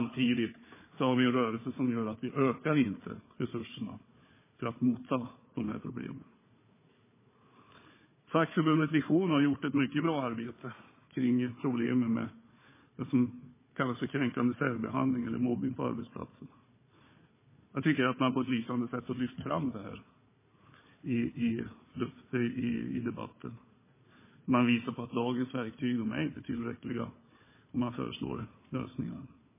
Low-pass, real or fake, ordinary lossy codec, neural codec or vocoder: 3.6 kHz; fake; MP3, 16 kbps; codec, 16 kHz, 4 kbps, FunCodec, trained on Chinese and English, 50 frames a second